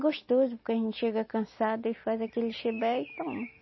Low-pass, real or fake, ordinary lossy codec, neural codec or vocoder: 7.2 kHz; real; MP3, 24 kbps; none